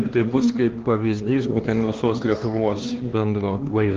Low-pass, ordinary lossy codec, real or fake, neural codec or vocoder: 7.2 kHz; Opus, 16 kbps; fake; codec, 16 kHz, 2 kbps, X-Codec, HuBERT features, trained on LibriSpeech